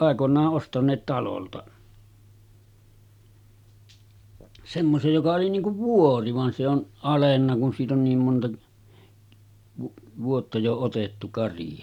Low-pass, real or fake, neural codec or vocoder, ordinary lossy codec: 19.8 kHz; real; none; none